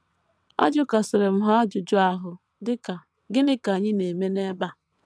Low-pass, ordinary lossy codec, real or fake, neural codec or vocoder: none; none; fake; vocoder, 22.05 kHz, 80 mel bands, WaveNeXt